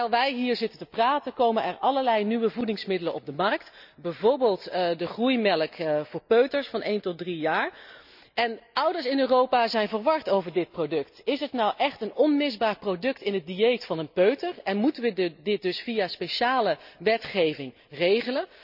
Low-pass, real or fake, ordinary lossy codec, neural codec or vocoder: 5.4 kHz; real; none; none